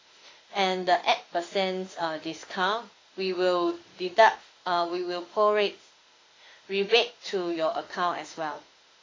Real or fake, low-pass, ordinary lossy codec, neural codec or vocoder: fake; 7.2 kHz; AAC, 32 kbps; autoencoder, 48 kHz, 32 numbers a frame, DAC-VAE, trained on Japanese speech